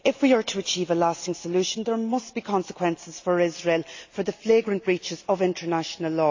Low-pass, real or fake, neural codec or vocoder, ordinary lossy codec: 7.2 kHz; real; none; AAC, 48 kbps